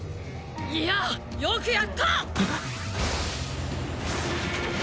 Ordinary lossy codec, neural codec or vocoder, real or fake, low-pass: none; none; real; none